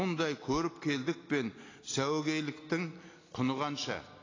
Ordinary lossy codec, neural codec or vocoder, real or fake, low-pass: AAC, 32 kbps; none; real; 7.2 kHz